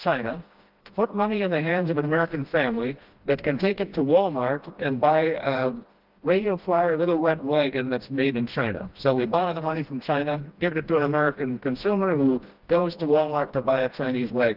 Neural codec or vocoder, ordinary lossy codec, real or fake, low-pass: codec, 16 kHz, 1 kbps, FreqCodec, smaller model; Opus, 32 kbps; fake; 5.4 kHz